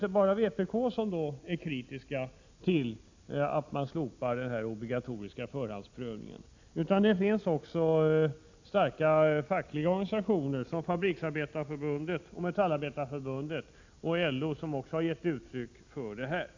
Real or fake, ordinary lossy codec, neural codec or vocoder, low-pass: real; AAC, 48 kbps; none; 7.2 kHz